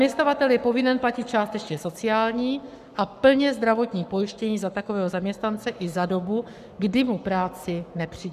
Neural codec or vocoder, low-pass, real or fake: codec, 44.1 kHz, 7.8 kbps, Pupu-Codec; 14.4 kHz; fake